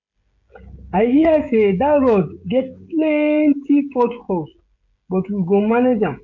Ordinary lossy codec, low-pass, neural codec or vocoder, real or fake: MP3, 48 kbps; 7.2 kHz; codec, 16 kHz, 16 kbps, FreqCodec, smaller model; fake